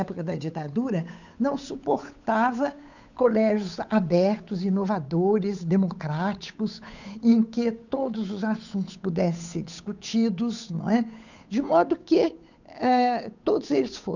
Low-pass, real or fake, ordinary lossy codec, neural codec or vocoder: 7.2 kHz; fake; none; codec, 16 kHz, 8 kbps, FunCodec, trained on Chinese and English, 25 frames a second